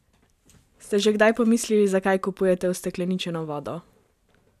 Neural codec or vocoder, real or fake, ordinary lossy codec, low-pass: vocoder, 44.1 kHz, 128 mel bands every 512 samples, BigVGAN v2; fake; none; 14.4 kHz